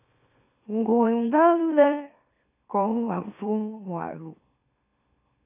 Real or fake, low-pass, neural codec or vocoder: fake; 3.6 kHz; autoencoder, 44.1 kHz, a latent of 192 numbers a frame, MeloTTS